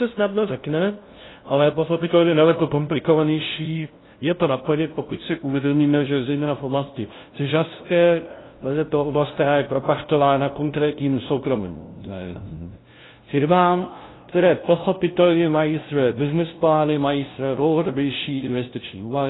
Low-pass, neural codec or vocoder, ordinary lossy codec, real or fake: 7.2 kHz; codec, 16 kHz, 0.5 kbps, FunCodec, trained on LibriTTS, 25 frames a second; AAC, 16 kbps; fake